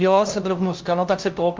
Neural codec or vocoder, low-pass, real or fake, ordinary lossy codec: codec, 16 kHz, 0.5 kbps, FunCodec, trained on LibriTTS, 25 frames a second; 7.2 kHz; fake; Opus, 32 kbps